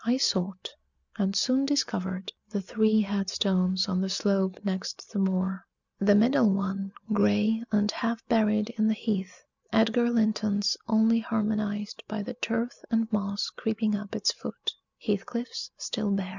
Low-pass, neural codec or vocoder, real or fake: 7.2 kHz; none; real